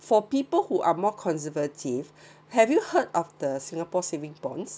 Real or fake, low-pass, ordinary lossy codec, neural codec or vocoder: real; none; none; none